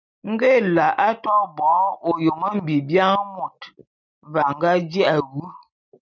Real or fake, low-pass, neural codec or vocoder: real; 7.2 kHz; none